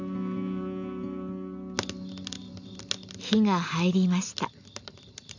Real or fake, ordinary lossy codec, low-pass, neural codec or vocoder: real; none; 7.2 kHz; none